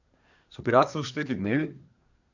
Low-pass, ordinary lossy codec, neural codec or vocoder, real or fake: 7.2 kHz; none; codec, 24 kHz, 1 kbps, SNAC; fake